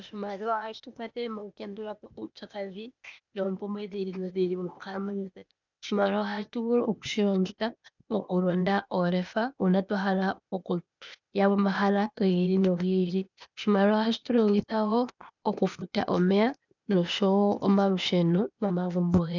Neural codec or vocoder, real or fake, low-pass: codec, 16 kHz, 0.8 kbps, ZipCodec; fake; 7.2 kHz